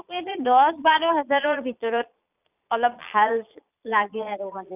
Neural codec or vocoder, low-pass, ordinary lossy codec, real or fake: vocoder, 22.05 kHz, 80 mel bands, Vocos; 3.6 kHz; none; fake